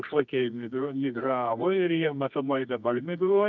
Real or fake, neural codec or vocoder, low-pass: fake; codec, 24 kHz, 0.9 kbps, WavTokenizer, medium music audio release; 7.2 kHz